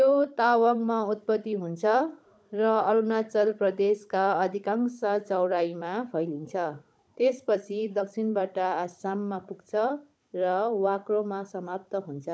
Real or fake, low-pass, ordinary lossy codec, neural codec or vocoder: fake; none; none; codec, 16 kHz, 16 kbps, FunCodec, trained on Chinese and English, 50 frames a second